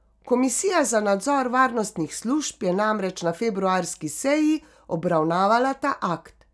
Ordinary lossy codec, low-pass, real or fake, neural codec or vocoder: none; none; real; none